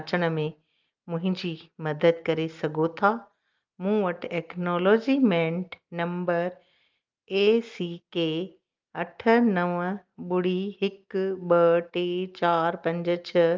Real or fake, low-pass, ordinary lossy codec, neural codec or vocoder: real; 7.2 kHz; Opus, 32 kbps; none